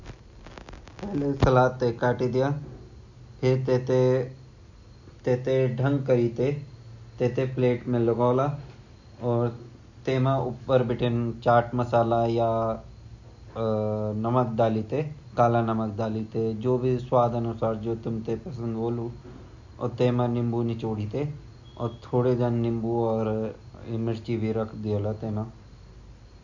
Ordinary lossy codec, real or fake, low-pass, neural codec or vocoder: none; real; 7.2 kHz; none